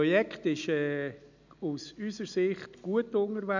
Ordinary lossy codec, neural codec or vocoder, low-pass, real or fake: none; none; 7.2 kHz; real